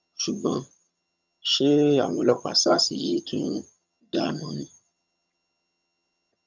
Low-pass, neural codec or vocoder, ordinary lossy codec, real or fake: 7.2 kHz; vocoder, 22.05 kHz, 80 mel bands, HiFi-GAN; none; fake